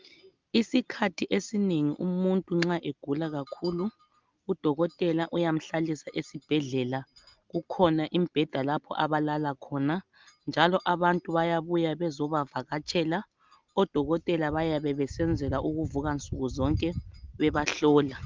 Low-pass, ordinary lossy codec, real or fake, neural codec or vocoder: 7.2 kHz; Opus, 32 kbps; real; none